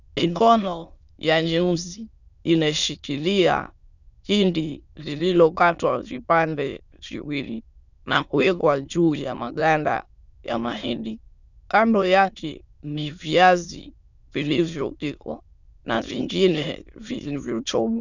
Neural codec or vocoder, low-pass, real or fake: autoencoder, 22.05 kHz, a latent of 192 numbers a frame, VITS, trained on many speakers; 7.2 kHz; fake